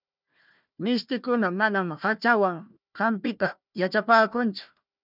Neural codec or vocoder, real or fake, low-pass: codec, 16 kHz, 1 kbps, FunCodec, trained on Chinese and English, 50 frames a second; fake; 5.4 kHz